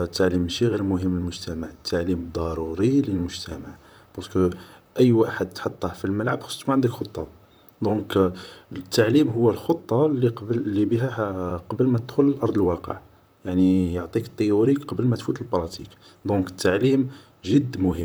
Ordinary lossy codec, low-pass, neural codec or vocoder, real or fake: none; none; vocoder, 44.1 kHz, 128 mel bands, Pupu-Vocoder; fake